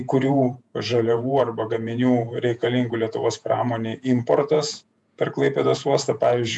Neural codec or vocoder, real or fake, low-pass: vocoder, 48 kHz, 128 mel bands, Vocos; fake; 10.8 kHz